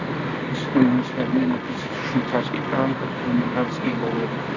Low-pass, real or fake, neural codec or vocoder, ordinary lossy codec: 7.2 kHz; fake; codec, 24 kHz, 0.9 kbps, WavTokenizer, medium music audio release; Opus, 64 kbps